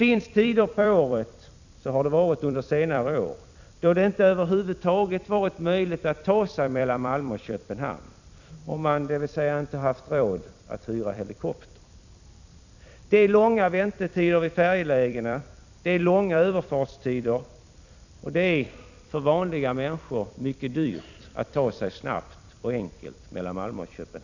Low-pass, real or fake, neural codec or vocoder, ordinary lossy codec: 7.2 kHz; fake; vocoder, 44.1 kHz, 128 mel bands every 256 samples, BigVGAN v2; none